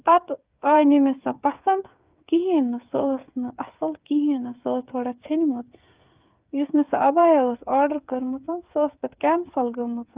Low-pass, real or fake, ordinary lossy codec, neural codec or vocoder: 3.6 kHz; fake; Opus, 24 kbps; codec, 16 kHz, 8 kbps, FreqCodec, smaller model